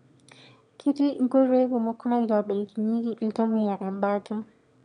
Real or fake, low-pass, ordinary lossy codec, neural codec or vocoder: fake; 9.9 kHz; none; autoencoder, 22.05 kHz, a latent of 192 numbers a frame, VITS, trained on one speaker